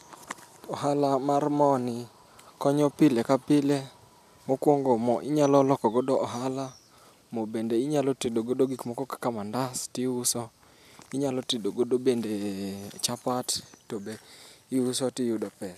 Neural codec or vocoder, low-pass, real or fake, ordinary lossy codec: none; 14.4 kHz; real; none